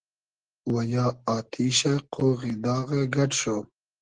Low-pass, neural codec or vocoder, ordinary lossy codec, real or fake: 9.9 kHz; none; Opus, 16 kbps; real